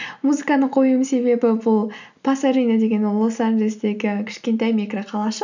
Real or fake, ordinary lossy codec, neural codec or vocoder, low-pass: real; none; none; 7.2 kHz